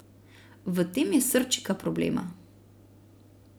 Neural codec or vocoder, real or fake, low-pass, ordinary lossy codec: none; real; none; none